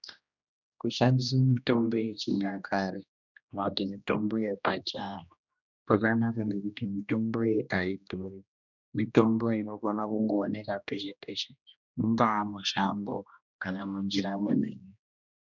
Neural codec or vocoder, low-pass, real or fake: codec, 16 kHz, 1 kbps, X-Codec, HuBERT features, trained on general audio; 7.2 kHz; fake